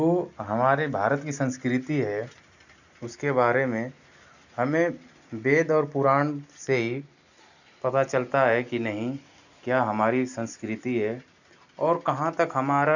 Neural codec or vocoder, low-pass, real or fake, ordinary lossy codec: none; 7.2 kHz; real; none